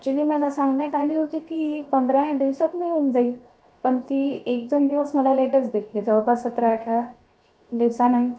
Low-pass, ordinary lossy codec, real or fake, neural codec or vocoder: none; none; fake; codec, 16 kHz, 0.7 kbps, FocalCodec